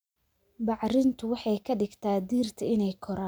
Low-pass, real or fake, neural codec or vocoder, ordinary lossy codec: none; real; none; none